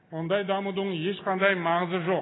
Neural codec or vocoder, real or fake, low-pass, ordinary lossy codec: none; real; 7.2 kHz; AAC, 16 kbps